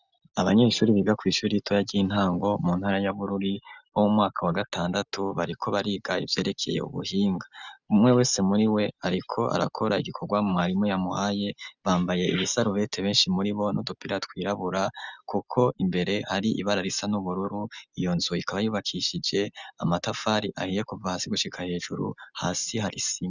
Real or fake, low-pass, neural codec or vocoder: real; 7.2 kHz; none